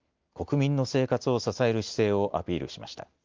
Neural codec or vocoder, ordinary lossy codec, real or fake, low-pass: none; Opus, 32 kbps; real; 7.2 kHz